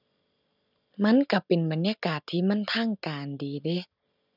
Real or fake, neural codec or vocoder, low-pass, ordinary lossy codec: real; none; 5.4 kHz; none